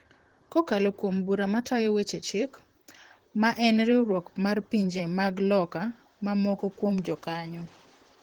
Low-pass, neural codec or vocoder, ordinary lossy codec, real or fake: 19.8 kHz; vocoder, 44.1 kHz, 128 mel bands, Pupu-Vocoder; Opus, 16 kbps; fake